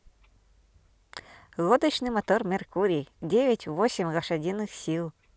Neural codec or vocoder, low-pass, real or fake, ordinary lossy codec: none; none; real; none